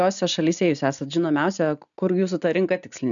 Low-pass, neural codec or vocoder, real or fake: 7.2 kHz; none; real